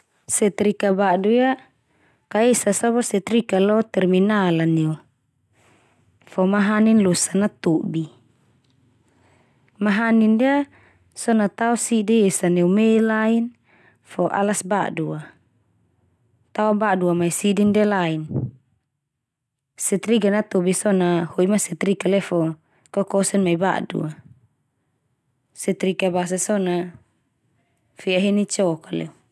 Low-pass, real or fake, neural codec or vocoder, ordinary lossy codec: none; real; none; none